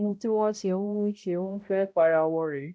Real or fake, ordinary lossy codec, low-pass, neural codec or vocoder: fake; none; none; codec, 16 kHz, 0.5 kbps, X-Codec, HuBERT features, trained on balanced general audio